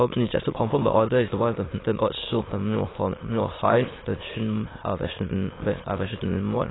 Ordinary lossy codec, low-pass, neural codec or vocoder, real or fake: AAC, 16 kbps; 7.2 kHz; autoencoder, 22.05 kHz, a latent of 192 numbers a frame, VITS, trained on many speakers; fake